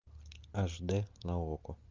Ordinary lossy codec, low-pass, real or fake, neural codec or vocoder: Opus, 24 kbps; 7.2 kHz; real; none